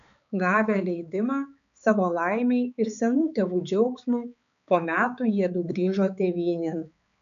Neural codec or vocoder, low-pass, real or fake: codec, 16 kHz, 4 kbps, X-Codec, HuBERT features, trained on balanced general audio; 7.2 kHz; fake